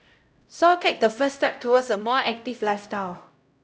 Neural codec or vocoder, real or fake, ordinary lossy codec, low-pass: codec, 16 kHz, 0.5 kbps, X-Codec, HuBERT features, trained on LibriSpeech; fake; none; none